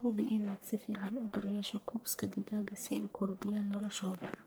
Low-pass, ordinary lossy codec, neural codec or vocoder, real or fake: none; none; codec, 44.1 kHz, 1.7 kbps, Pupu-Codec; fake